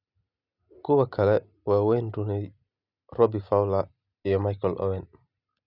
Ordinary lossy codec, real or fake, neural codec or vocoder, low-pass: none; real; none; 5.4 kHz